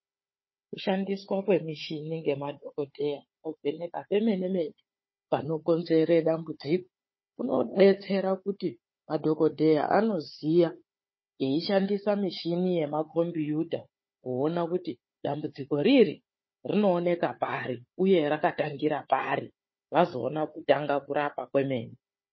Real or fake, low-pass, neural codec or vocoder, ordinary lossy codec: fake; 7.2 kHz; codec, 16 kHz, 4 kbps, FunCodec, trained on Chinese and English, 50 frames a second; MP3, 24 kbps